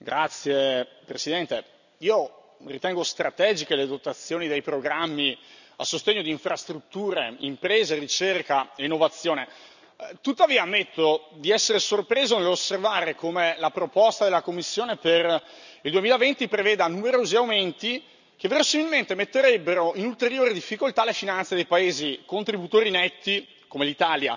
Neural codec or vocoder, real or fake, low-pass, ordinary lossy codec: none; real; 7.2 kHz; none